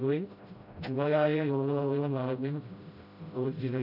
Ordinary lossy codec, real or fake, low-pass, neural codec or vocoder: none; fake; 5.4 kHz; codec, 16 kHz, 0.5 kbps, FreqCodec, smaller model